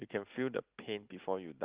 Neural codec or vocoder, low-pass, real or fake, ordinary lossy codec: none; 3.6 kHz; real; Opus, 32 kbps